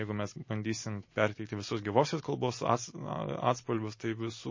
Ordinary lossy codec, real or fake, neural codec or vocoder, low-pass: MP3, 32 kbps; real; none; 7.2 kHz